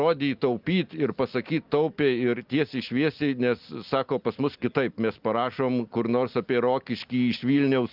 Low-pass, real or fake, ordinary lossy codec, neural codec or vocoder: 5.4 kHz; real; Opus, 24 kbps; none